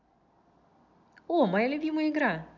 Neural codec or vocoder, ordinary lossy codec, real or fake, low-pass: none; none; real; 7.2 kHz